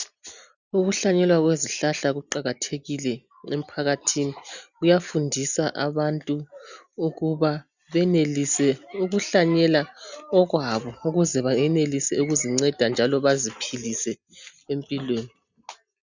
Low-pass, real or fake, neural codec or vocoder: 7.2 kHz; real; none